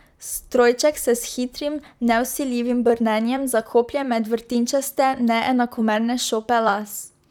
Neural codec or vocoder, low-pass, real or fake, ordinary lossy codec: vocoder, 44.1 kHz, 128 mel bands, Pupu-Vocoder; 19.8 kHz; fake; none